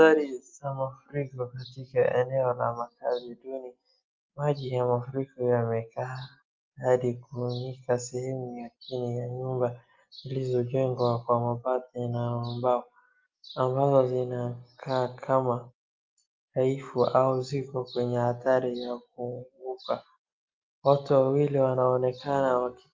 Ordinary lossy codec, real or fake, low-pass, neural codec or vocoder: Opus, 32 kbps; real; 7.2 kHz; none